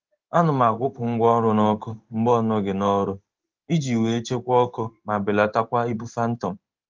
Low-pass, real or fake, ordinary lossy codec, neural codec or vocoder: 7.2 kHz; fake; Opus, 24 kbps; codec, 16 kHz in and 24 kHz out, 1 kbps, XY-Tokenizer